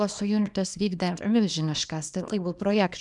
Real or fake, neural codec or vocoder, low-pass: fake; codec, 24 kHz, 0.9 kbps, WavTokenizer, small release; 10.8 kHz